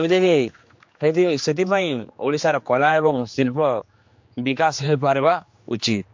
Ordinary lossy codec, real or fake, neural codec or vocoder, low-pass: MP3, 48 kbps; fake; codec, 16 kHz, 2 kbps, X-Codec, HuBERT features, trained on general audio; 7.2 kHz